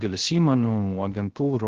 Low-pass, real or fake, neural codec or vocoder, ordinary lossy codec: 7.2 kHz; fake; codec, 16 kHz, 0.3 kbps, FocalCodec; Opus, 16 kbps